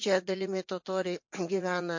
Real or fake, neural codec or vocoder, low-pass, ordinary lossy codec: fake; vocoder, 44.1 kHz, 80 mel bands, Vocos; 7.2 kHz; MP3, 48 kbps